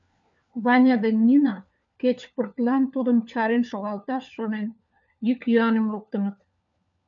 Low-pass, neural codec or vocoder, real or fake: 7.2 kHz; codec, 16 kHz, 4 kbps, FunCodec, trained on LibriTTS, 50 frames a second; fake